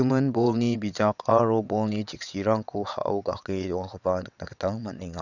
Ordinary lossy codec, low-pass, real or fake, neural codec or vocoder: none; 7.2 kHz; fake; vocoder, 22.05 kHz, 80 mel bands, WaveNeXt